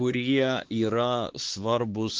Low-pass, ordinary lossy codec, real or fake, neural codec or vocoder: 7.2 kHz; Opus, 16 kbps; fake; codec, 16 kHz, 4 kbps, X-Codec, HuBERT features, trained on LibriSpeech